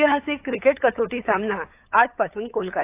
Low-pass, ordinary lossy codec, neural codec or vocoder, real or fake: 3.6 kHz; AAC, 24 kbps; codec, 16 kHz, 8 kbps, FunCodec, trained on LibriTTS, 25 frames a second; fake